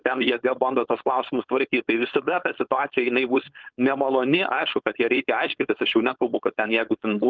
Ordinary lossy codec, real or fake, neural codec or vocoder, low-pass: Opus, 32 kbps; fake; codec, 16 kHz, 4.8 kbps, FACodec; 7.2 kHz